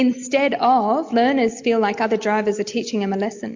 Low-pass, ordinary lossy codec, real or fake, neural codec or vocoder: 7.2 kHz; MP3, 48 kbps; real; none